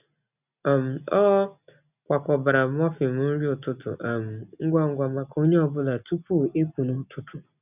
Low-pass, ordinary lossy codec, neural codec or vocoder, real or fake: 3.6 kHz; none; none; real